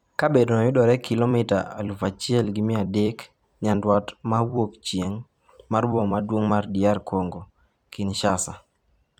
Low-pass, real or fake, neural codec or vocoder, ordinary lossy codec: 19.8 kHz; fake; vocoder, 44.1 kHz, 128 mel bands every 256 samples, BigVGAN v2; none